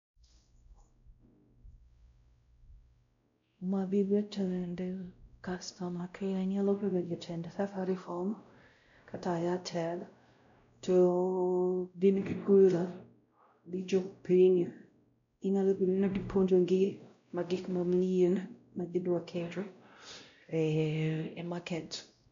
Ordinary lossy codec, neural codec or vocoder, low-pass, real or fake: MP3, 64 kbps; codec, 16 kHz, 0.5 kbps, X-Codec, WavLM features, trained on Multilingual LibriSpeech; 7.2 kHz; fake